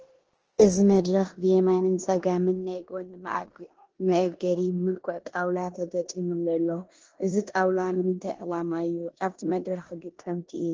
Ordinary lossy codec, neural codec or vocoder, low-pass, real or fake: Opus, 16 kbps; codec, 16 kHz in and 24 kHz out, 0.9 kbps, LongCat-Audio-Codec, four codebook decoder; 7.2 kHz; fake